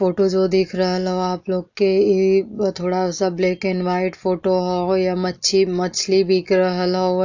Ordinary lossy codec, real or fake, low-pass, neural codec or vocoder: AAC, 48 kbps; real; 7.2 kHz; none